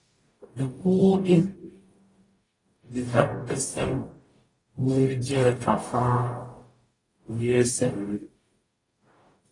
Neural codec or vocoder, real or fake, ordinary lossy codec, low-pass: codec, 44.1 kHz, 0.9 kbps, DAC; fake; AAC, 32 kbps; 10.8 kHz